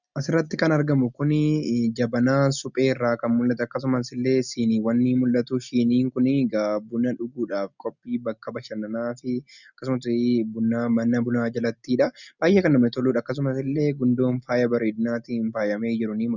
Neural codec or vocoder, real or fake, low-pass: none; real; 7.2 kHz